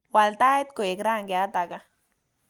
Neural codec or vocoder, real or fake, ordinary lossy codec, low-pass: none; real; Opus, 32 kbps; 19.8 kHz